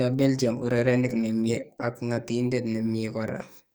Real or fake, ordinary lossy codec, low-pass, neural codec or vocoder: fake; none; none; codec, 44.1 kHz, 2.6 kbps, SNAC